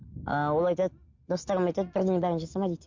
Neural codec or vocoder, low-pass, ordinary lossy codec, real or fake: none; 7.2 kHz; MP3, 48 kbps; real